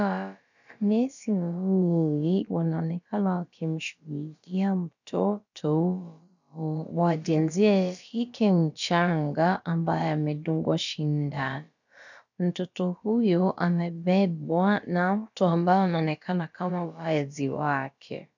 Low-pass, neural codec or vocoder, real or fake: 7.2 kHz; codec, 16 kHz, about 1 kbps, DyCAST, with the encoder's durations; fake